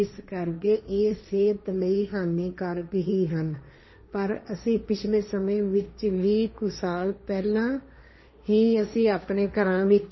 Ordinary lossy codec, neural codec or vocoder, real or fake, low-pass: MP3, 24 kbps; codec, 16 kHz, 1.1 kbps, Voila-Tokenizer; fake; 7.2 kHz